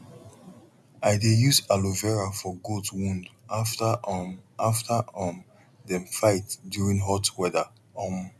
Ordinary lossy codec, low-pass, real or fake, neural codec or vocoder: none; none; real; none